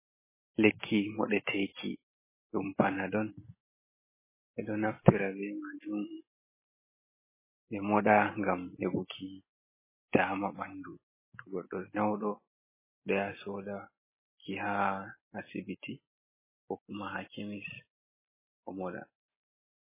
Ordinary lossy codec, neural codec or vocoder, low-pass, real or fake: MP3, 16 kbps; none; 3.6 kHz; real